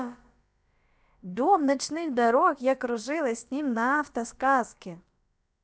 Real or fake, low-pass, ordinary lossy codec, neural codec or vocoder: fake; none; none; codec, 16 kHz, about 1 kbps, DyCAST, with the encoder's durations